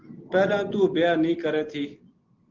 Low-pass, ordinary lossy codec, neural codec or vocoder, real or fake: 7.2 kHz; Opus, 16 kbps; none; real